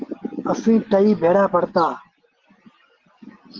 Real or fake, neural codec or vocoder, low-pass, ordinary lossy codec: real; none; 7.2 kHz; Opus, 16 kbps